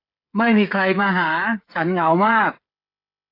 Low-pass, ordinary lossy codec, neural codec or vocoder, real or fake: 5.4 kHz; AAC, 32 kbps; codec, 16 kHz, 8 kbps, FreqCodec, smaller model; fake